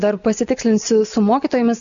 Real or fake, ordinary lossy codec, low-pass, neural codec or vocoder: real; MP3, 64 kbps; 7.2 kHz; none